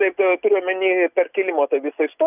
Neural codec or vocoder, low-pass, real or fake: none; 3.6 kHz; real